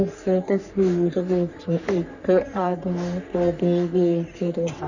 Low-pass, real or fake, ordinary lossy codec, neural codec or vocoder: 7.2 kHz; fake; none; codec, 44.1 kHz, 3.4 kbps, Pupu-Codec